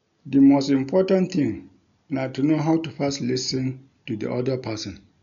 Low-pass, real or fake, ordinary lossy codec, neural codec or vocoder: 7.2 kHz; real; none; none